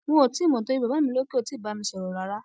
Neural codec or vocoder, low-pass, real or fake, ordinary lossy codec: none; none; real; none